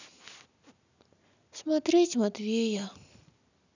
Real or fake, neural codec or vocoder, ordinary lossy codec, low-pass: real; none; none; 7.2 kHz